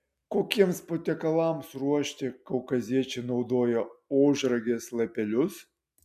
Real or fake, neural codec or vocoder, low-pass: real; none; 14.4 kHz